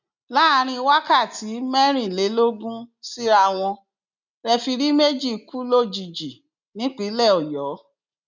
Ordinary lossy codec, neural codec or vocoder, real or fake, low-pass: none; none; real; 7.2 kHz